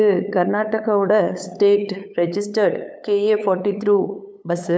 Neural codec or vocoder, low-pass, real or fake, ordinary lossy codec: codec, 16 kHz, 8 kbps, FunCodec, trained on LibriTTS, 25 frames a second; none; fake; none